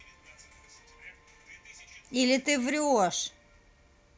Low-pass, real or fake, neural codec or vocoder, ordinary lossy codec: none; real; none; none